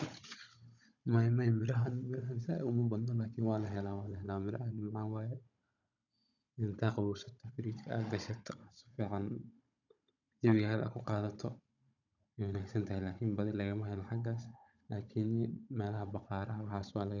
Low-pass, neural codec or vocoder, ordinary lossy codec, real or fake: 7.2 kHz; codec, 16 kHz, 16 kbps, FunCodec, trained on Chinese and English, 50 frames a second; none; fake